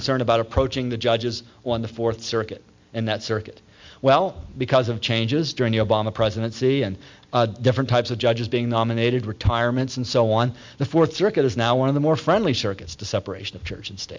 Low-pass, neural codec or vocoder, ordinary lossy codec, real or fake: 7.2 kHz; none; MP3, 64 kbps; real